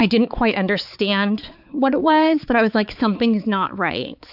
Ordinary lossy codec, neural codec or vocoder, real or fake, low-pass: AAC, 48 kbps; codec, 16 kHz, 4 kbps, X-Codec, HuBERT features, trained on balanced general audio; fake; 5.4 kHz